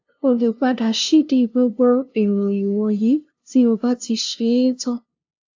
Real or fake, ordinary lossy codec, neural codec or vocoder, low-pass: fake; AAC, 48 kbps; codec, 16 kHz, 0.5 kbps, FunCodec, trained on LibriTTS, 25 frames a second; 7.2 kHz